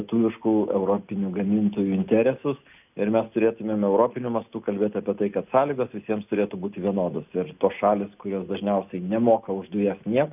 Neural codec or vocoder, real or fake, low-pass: none; real; 3.6 kHz